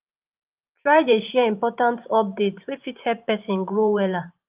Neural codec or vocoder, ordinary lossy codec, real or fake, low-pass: vocoder, 44.1 kHz, 128 mel bands every 512 samples, BigVGAN v2; Opus, 24 kbps; fake; 3.6 kHz